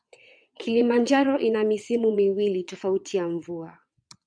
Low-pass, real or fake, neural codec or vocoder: 9.9 kHz; fake; vocoder, 22.05 kHz, 80 mel bands, WaveNeXt